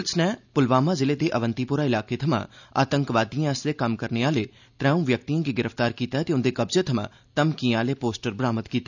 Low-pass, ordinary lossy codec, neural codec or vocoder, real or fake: 7.2 kHz; none; none; real